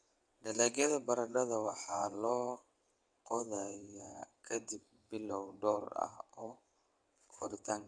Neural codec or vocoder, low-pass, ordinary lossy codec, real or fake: vocoder, 22.05 kHz, 80 mel bands, WaveNeXt; 9.9 kHz; none; fake